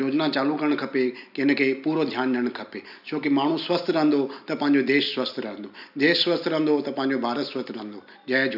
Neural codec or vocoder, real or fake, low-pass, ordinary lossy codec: none; real; 5.4 kHz; none